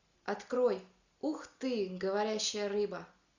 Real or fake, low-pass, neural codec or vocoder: real; 7.2 kHz; none